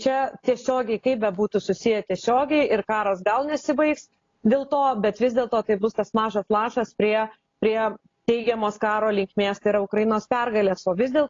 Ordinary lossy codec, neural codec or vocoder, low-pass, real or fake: AAC, 32 kbps; none; 7.2 kHz; real